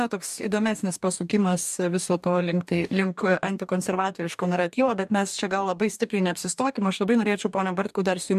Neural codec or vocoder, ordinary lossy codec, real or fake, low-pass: codec, 44.1 kHz, 2.6 kbps, DAC; MP3, 96 kbps; fake; 14.4 kHz